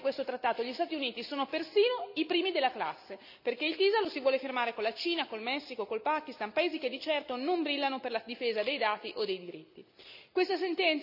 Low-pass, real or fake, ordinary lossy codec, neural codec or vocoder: 5.4 kHz; real; none; none